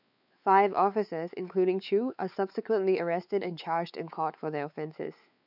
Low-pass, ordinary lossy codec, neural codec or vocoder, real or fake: 5.4 kHz; none; codec, 16 kHz, 4 kbps, X-Codec, WavLM features, trained on Multilingual LibriSpeech; fake